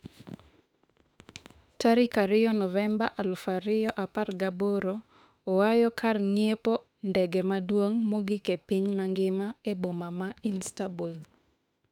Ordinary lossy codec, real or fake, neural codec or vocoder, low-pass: none; fake; autoencoder, 48 kHz, 32 numbers a frame, DAC-VAE, trained on Japanese speech; 19.8 kHz